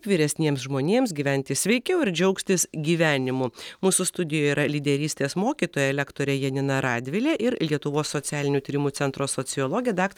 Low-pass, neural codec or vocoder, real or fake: 19.8 kHz; none; real